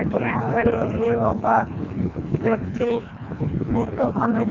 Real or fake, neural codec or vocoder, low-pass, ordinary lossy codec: fake; codec, 24 kHz, 1.5 kbps, HILCodec; 7.2 kHz; none